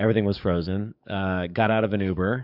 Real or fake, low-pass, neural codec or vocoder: fake; 5.4 kHz; codec, 44.1 kHz, 7.8 kbps, DAC